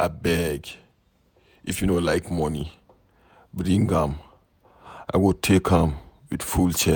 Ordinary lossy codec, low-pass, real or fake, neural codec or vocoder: none; none; fake; vocoder, 48 kHz, 128 mel bands, Vocos